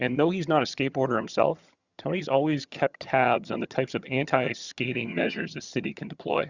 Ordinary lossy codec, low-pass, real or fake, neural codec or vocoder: Opus, 64 kbps; 7.2 kHz; fake; vocoder, 22.05 kHz, 80 mel bands, HiFi-GAN